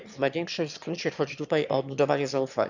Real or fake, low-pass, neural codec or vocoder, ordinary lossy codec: fake; 7.2 kHz; autoencoder, 22.05 kHz, a latent of 192 numbers a frame, VITS, trained on one speaker; none